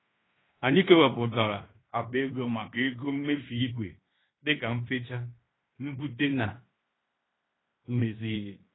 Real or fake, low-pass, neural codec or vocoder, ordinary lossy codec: fake; 7.2 kHz; codec, 16 kHz in and 24 kHz out, 0.9 kbps, LongCat-Audio-Codec, fine tuned four codebook decoder; AAC, 16 kbps